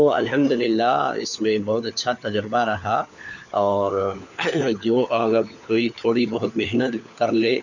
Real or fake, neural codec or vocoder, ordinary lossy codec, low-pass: fake; codec, 16 kHz, 4 kbps, FunCodec, trained on LibriTTS, 50 frames a second; none; 7.2 kHz